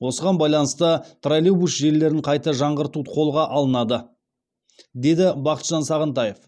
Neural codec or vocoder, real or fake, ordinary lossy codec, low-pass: none; real; none; none